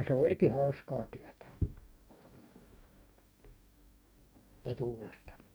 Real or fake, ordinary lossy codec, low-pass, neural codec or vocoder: fake; none; none; codec, 44.1 kHz, 2.6 kbps, DAC